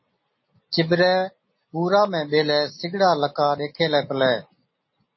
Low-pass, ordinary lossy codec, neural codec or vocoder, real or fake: 7.2 kHz; MP3, 24 kbps; none; real